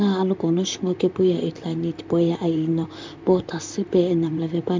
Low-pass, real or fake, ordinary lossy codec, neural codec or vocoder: 7.2 kHz; fake; MP3, 64 kbps; vocoder, 44.1 kHz, 128 mel bands, Pupu-Vocoder